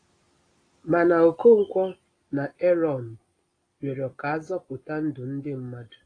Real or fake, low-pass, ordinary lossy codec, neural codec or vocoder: real; 9.9 kHz; AAC, 32 kbps; none